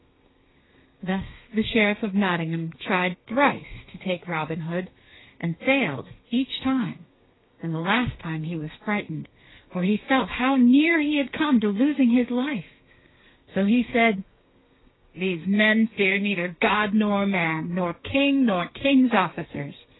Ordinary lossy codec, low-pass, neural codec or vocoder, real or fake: AAC, 16 kbps; 7.2 kHz; codec, 32 kHz, 1.9 kbps, SNAC; fake